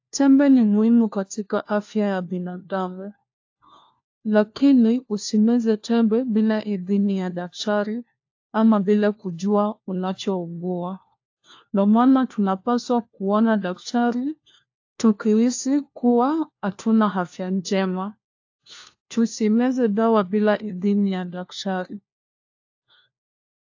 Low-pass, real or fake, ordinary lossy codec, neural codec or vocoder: 7.2 kHz; fake; AAC, 48 kbps; codec, 16 kHz, 1 kbps, FunCodec, trained on LibriTTS, 50 frames a second